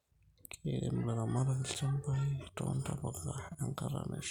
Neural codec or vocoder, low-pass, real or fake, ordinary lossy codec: none; 19.8 kHz; real; none